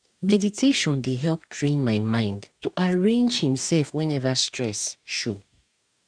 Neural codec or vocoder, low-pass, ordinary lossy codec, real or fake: codec, 44.1 kHz, 2.6 kbps, DAC; 9.9 kHz; none; fake